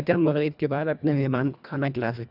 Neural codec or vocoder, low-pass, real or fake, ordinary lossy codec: codec, 24 kHz, 1.5 kbps, HILCodec; 5.4 kHz; fake; none